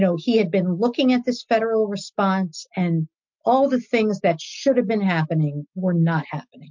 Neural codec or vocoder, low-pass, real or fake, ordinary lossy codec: none; 7.2 kHz; real; MP3, 48 kbps